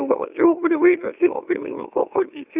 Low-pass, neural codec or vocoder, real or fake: 3.6 kHz; autoencoder, 44.1 kHz, a latent of 192 numbers a frame, MeloTTS; fake